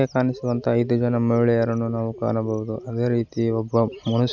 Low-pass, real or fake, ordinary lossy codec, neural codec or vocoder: 7.2 kHz; real; none; none